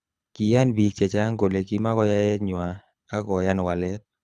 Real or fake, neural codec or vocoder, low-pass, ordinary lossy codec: fake; codec, 24 kHz, 6 kbps, HILCodec; none; none